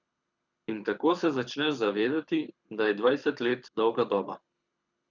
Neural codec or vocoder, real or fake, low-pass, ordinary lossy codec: codec, 24 kHz, 6 kbps, HILCodec; fake; 7.2 kHz; none